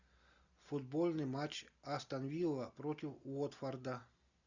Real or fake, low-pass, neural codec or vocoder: real; 7.2 kHz; none